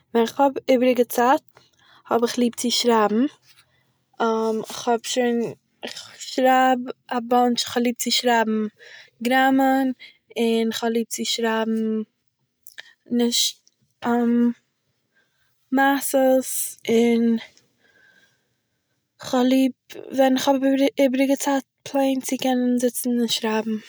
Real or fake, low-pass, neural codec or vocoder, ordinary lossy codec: real; none; none; none